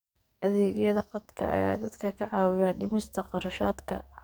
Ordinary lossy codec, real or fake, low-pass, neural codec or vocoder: none; fake; none; codec, 44.1 kHz, 2.6 kbps, SNAC